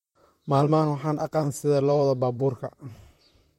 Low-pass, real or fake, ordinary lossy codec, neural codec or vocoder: 19.8 kHz; fake; MP3, 64 kbps; vocoder, 44.1 kHz, 128 mel bands, Pupu-Vocoder